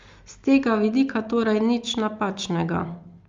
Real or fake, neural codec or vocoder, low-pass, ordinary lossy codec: real; none; 7.2 kHz; Opus, 32 kbps